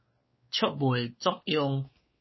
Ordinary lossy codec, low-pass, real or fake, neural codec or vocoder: MP3, 24 kbps; 7.2 kHz; fake; codec, 16 kHz, 2 kbps, X-Codec, WavLM features, trained on Multilingual LibriSpeech